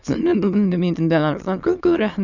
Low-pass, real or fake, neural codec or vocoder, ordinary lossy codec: 7.2 kHz; fake; autoencoder, 22.05 kHz, a latent of 192 numbers a frame, VITS, trained on many speakers; none